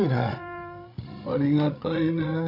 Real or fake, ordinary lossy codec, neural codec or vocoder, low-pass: fake; none; codec, 16 kHz, 16 kbps, FreqCodec, larger model; 5.4 kHz